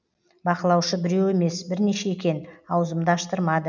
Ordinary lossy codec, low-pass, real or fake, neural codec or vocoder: none; 7.2 kHz; real; none